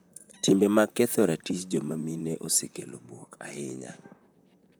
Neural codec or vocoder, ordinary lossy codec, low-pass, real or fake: vocoder, 44.1 kHz, 128 mel bands, Pupu-Vocoder; none; none; fake